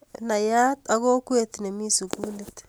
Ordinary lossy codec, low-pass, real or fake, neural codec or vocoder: none; none; real; none